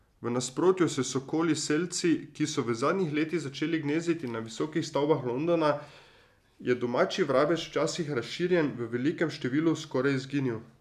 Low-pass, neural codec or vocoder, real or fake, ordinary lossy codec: 14.4 kHz; none; real; none